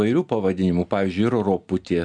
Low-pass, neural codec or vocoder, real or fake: 9.9 kHz; none; real